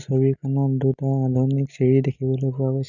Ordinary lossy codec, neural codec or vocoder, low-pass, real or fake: none; none; 7.2 kHz; real